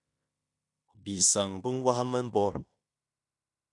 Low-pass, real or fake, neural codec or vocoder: 10.8 kHz; fake; codec, 16 kHz in and 24 kHz out, 0.9 kbps, LongCat-Audio-Codec, fine tuned four codebook decoder